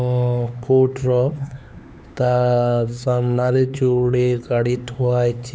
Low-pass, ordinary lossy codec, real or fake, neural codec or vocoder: none; none; fake; codec, 16 kHz, 4 kbps, X-Codec, HuBERT features, trained on LibriSpeech